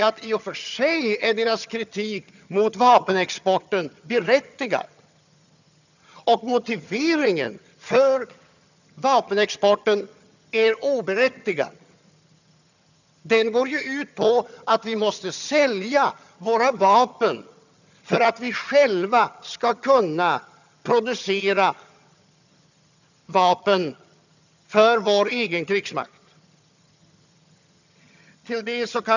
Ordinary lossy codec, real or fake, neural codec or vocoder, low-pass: none; fake; vocoder, 22.05 kHz, 80 mel bands, HiFi-GAN; 7.2 kHz